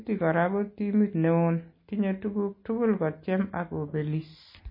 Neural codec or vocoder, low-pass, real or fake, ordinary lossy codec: none; 5.4 kHz; real; MP3, 24 kbps